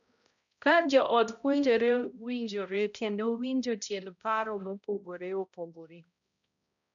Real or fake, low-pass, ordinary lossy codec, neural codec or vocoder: fake; 7.2 kHz; none; codec, 16 kHz, 0.5 kbps, X-Codec, HuBERT features, trained on balanced general audio